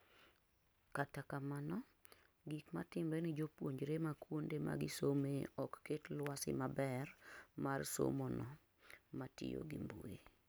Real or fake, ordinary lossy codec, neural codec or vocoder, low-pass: real; none; none; none